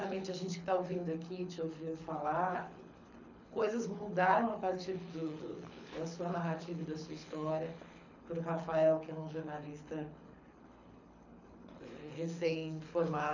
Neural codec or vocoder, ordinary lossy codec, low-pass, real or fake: codec, 24 kHz, 6 kbps, HILCodec; none; 7.2 kHz; fake